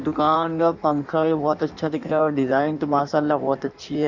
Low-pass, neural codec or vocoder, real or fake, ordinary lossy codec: 7.2 kHz; codec, 16 kHz in and 24 kHz out, 1.1 kbps, FireRedTTS-2 codec; fake; none